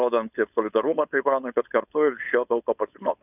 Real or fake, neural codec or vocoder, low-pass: fake; codec, 16 kHz, 4.8 kbps, FACodec; 3.6 kHz